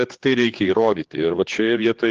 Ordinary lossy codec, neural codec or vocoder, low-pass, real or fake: Opus, 16 kbps; codec, 16 kHz, 4 kbps, X-Codec, HuBERT features, trained on general audio; 7.2 kHz; fake